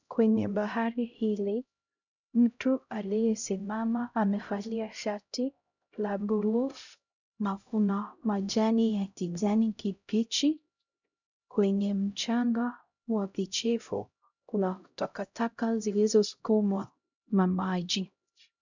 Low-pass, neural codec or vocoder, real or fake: 7.2 kHz; codec, 16 kHz, 0.5 kbps, X-Codec, HuBERT features, trained on LibriSpeech; fake